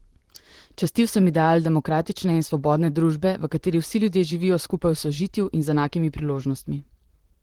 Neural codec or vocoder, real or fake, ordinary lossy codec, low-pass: vocoder, 44.1 kHz, 128 mel bands, Pupu-Vocoder; fake; Opus, 16 kbps; 19.8 kHz